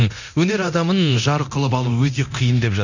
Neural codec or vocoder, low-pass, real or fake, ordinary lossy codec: codec, 24 kHz, 0.9 kbps, DualCodec; 7.2 kHz; fake; none